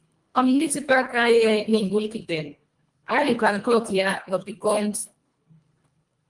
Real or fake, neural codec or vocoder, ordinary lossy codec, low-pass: fake; codec, 24 kHz, 1.5 kbps, HILCodec; Opus, 32 kbps; 10.8 kHz